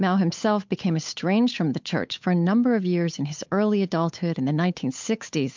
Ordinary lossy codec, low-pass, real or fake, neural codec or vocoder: MP3, 64 kbps; 7.2 kHz; fake; codec, 16 kHz, 8 kbps, FunCodec, trained on Chinese and English, 25 frames a second